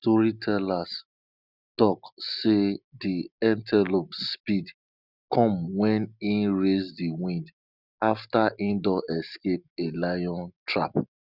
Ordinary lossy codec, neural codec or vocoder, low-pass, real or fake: none; none; 5.4 kHz; real